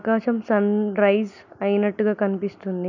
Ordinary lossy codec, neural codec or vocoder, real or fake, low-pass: none; none; real; 7.2 kHz